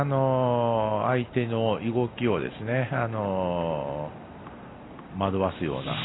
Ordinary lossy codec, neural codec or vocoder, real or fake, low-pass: AAC, 16 kbps; none; real; 7.2 kHz